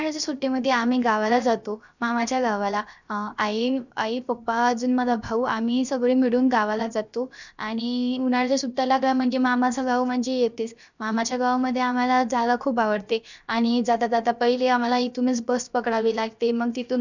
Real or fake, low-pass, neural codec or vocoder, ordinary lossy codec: fake; 7.2 kHz; codec, 16 kHz, 0.7 kbps, FocalCodec; none